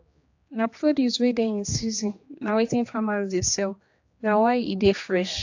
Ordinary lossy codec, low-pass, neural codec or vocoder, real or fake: AAC, 48 kbps; 7.2 kHz; codec, 16 kHz, 2 kbps, X-Codec, HuBERT features, trained on general audio; fake